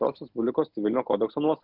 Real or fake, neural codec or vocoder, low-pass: real; none; 5.4 kHz